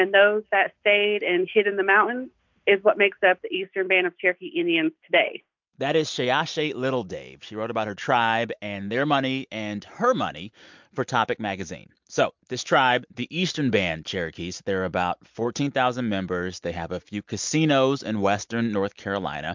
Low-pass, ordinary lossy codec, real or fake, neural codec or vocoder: 7.2 kHz; MP3, 64 kbps; real; none